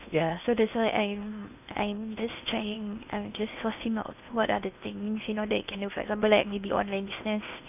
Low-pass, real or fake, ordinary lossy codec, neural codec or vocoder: 3.6 kHz; fake; none; codec, 16 kHz in and 24 kHz out, 0.8 kbps, FocalCodec, streaming, 65536 codes